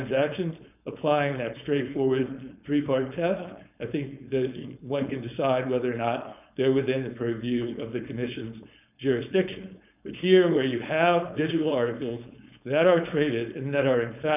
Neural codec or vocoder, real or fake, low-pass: codec, 16 kHz, 4.8 kbps, FACodec; fake; 3.6 kHz